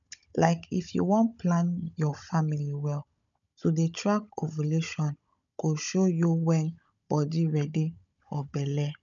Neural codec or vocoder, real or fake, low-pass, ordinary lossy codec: codec, 16 kHz, 16 kbps, FunCodec, trained on Chinese and English, 50 frames a second; fake; 7.2 kHz; none